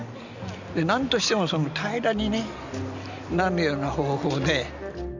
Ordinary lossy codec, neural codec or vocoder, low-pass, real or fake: none; none; 7.2 kHz; real